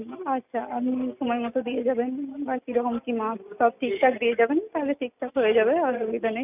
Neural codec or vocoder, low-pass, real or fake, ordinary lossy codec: none; 3.6 kHz; real; none